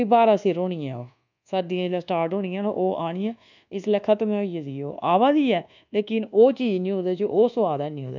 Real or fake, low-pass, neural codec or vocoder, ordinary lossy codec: fake; 7.2 kHz; codec, 24 kHz, 1.2 kbps, DualCodec; none